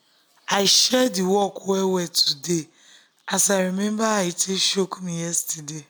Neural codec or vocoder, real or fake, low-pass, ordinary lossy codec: none; real; none; none